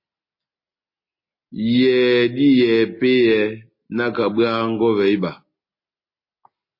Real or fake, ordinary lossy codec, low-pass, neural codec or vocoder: real; MP3, 24 kbps; 5.4 kHz; none